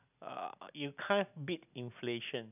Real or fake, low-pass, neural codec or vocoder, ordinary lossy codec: real; 3.6 kHz; none; none